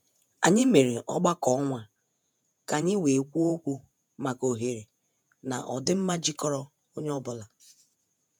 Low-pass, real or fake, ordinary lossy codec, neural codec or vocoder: none; fake; none; vocoder, 48 kHz, 128 mel bands, Vocos